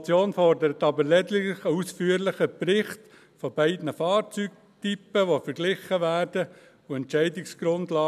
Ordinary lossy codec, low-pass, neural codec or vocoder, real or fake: none; 14.4 kHz; none; real